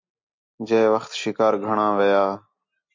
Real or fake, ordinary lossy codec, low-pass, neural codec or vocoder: real; MP3, 48 kbps; 7.2 kHz; none